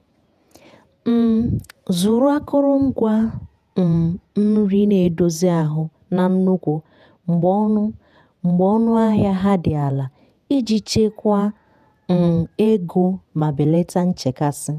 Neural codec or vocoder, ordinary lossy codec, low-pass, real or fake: vocoder, 48 kHz, 128 mel bands, Vocos; none; 14.4 kHz; fake